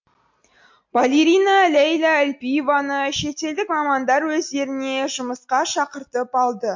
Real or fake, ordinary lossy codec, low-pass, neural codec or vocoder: real; MP3, 48 kbps; 7.2 kHz; none